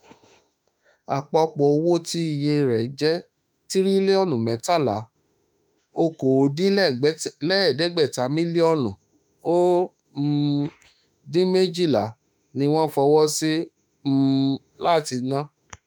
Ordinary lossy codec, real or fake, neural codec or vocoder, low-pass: none; fake; autoencoder, 48 kHz, 32 numbers a frame, DAC-VAE, trained on Japanese speech; none